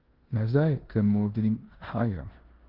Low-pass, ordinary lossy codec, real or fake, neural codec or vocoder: 5.4 kHz; Opus, 16 kbps; fake; codec, 16 kHz in and 24 kHz out, 0.9 kbps, LongCat-Audio-Codec, four codebook decoder